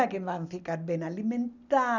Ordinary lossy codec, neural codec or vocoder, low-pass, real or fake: none; none; 7.2 kHz; real